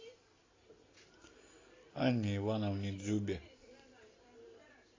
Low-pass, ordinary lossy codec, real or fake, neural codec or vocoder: 7.2 kHz; AAC, 32 kbps; real; none